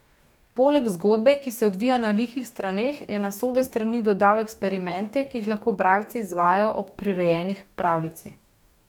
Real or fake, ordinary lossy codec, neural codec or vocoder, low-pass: fake; none; codec, 44.1 kHz, 2.6 kbps, DAC; 19.8 kHz